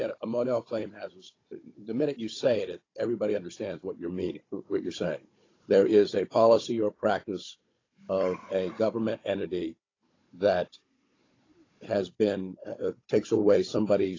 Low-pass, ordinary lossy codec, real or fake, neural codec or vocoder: 7.2 kHz; AAC, 32 kbps; fake; codec, 16 kHz, 16 kbps, FunCodec, trained on Chinese and English, 50 frames a second